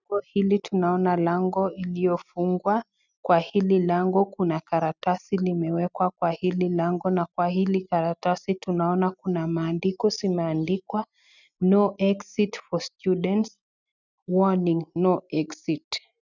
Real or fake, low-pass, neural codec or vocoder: real; 7.2 kHz; none